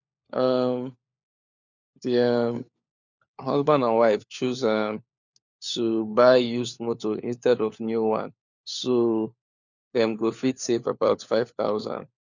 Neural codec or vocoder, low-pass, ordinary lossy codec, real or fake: codec, 16 kHz, 4 kbps, FunCodec, trained on LibriTTS, 50 frames a second; 7.2 kHz; none; fake